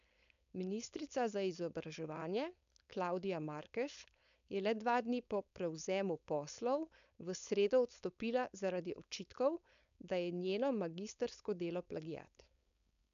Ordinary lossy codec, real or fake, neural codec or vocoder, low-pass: none; fake; codec, 16 kHz, 4.8 kbps, FACodec; 7.2 kHz